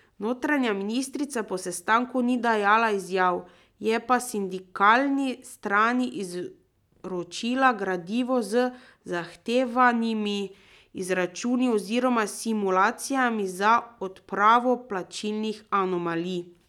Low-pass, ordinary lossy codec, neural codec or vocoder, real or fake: 19.8 kHz; none; none; real